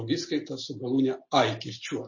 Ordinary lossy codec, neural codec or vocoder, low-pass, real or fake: MP3, 32 kbps; none; 7.2 kHz; real